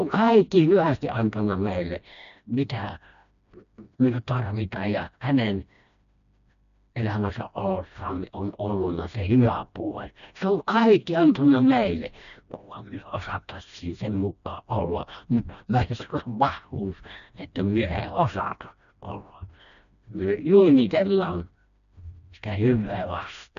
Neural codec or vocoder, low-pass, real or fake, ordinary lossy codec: codec, 16 kHz, 1 kbps, FreqCodec, smaller model; 7.2 kHz; fake; none